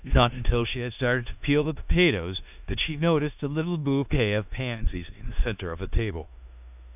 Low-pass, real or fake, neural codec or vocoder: 3.6 kHz; fake; codec, 16 kHz in and 24 kHz out, 0.9 kbps, LongCat-Audio-Codec, four codebook decoder